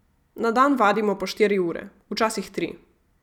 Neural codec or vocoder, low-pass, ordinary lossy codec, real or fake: vocoder, 44.1 kHz, 128 mel bands every 256 samples, BigVGAN v2; 19.8 kHz; none; fake